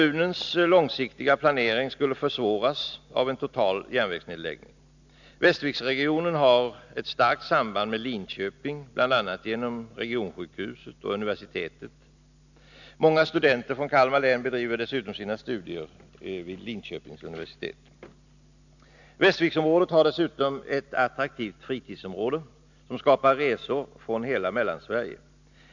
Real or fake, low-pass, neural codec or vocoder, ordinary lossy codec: real; 7.2 kHz; none; none